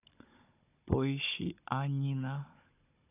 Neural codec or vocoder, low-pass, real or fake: codec, 16 kHz, 16 kbps, FunCodec, trained on Chinese and English, 50 frames a second; 3.6 kHz; fake